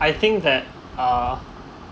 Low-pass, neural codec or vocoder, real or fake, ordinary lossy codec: none; none; real; none